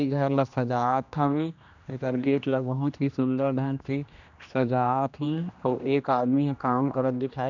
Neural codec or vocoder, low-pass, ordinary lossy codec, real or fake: codec, 16 kHz, 1 kbps, X-Codec, HuBERT features, trained on general audio; 7.2 kHz; none; fake